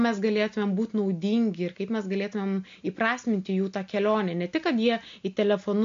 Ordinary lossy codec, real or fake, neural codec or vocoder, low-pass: MP3, 48 kbps; real; none; 7.2 kHz